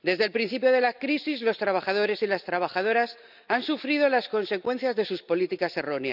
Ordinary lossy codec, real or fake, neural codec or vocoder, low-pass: none; real; none; 5.4 kHz